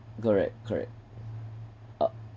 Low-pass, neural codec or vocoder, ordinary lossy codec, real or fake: none; none; none; real